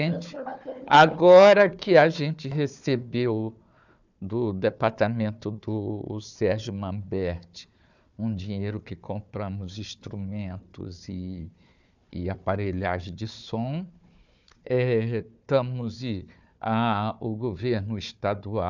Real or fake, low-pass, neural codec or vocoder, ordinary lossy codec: fake; 7.2 kHz; codec, 16 kHz, 4 kbps, FunCodec, trained on Chinese and English, 50 frames a second; none